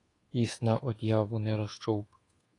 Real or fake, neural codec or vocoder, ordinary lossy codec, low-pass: fake; codec, 24 kHz, 1.2 kbps, DualCodec; AAC, 32 kbps; 10.8 kHz